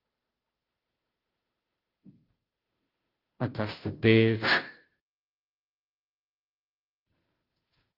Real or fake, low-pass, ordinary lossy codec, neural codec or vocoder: fake; 5.4 kHz; Opus, 32 kbps; codec, 16 kHz, 0.5 kbps, FunCodec, trained on Chinese and English, 25 frames a second